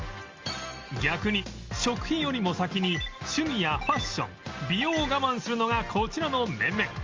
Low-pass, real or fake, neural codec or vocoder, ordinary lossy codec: 7.2 kHz; real; none; Opus, 32 kbps